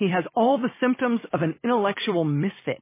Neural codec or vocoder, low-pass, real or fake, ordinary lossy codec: vocoder, 44.1 kHz, 128 mel bands every 256 samples, BigVGAN v2; 3.6 kHz; fake; MP3, 16 kbps